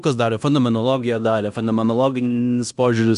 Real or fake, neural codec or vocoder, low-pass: fake; codec, 16 kHz in and 24 kHz out, 0.9 kbps, LongCat-Audio-Codec, fine tuned four codebook decoder; 10.8 kHz